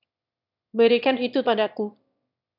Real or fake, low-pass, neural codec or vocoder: fake; 5.4 kHz; autoencoder, 22.05 kHz, a latent of 192 numbers a frame, VITS, trained on one speaker